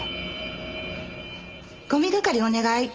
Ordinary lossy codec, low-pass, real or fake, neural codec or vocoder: Opus, 24 kbps; 7.2 kHz; real; none